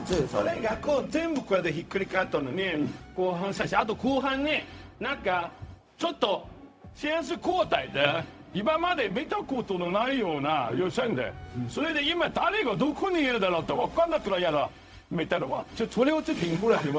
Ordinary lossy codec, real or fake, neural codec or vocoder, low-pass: none; fake; codec, 16 kHz, 0.4 kbps, LongCat-Audio-Codec; none